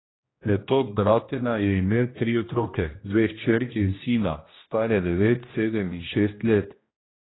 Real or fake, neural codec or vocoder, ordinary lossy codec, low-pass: fake; codec, 16 kHz, 1 kbps, X-Codec, HuBERT features, trained on general audio; AAC, 16 kbps; 7.2 kHz